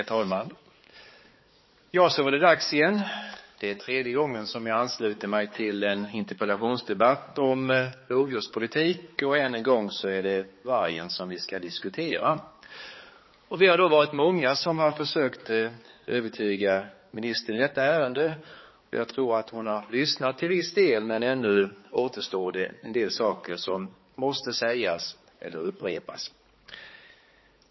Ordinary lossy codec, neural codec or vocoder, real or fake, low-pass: MP3, 24 kbps; codec, 16 kHz, 4 kbps, X-Codec, HuBERT features, trained on balanced general audio; fake; 7.2 kHz